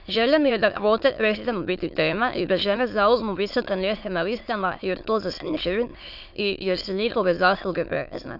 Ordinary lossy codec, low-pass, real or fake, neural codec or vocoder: none; 5.4 kHz; fake; autoencoder, 22.05 kHz, a latent of 192 numbers a frame, VITS, trained on many speakers